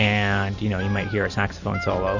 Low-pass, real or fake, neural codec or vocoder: 7.2 kHz; real; none